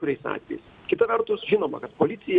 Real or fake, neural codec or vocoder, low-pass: real; none; 9.9 kHz